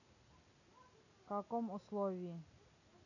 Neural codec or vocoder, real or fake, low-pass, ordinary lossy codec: none; real; 7.2 kHz; none